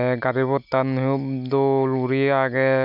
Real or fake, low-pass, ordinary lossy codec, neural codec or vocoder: real; 5.4 kHz; none; none